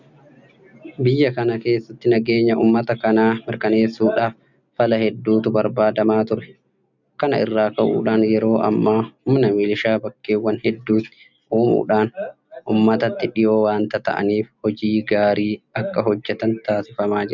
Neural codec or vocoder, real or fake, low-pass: none; real; 7.2 kHz